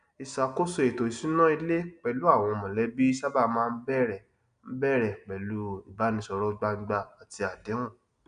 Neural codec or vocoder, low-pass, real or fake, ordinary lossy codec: none; 9.9 kHz; real; none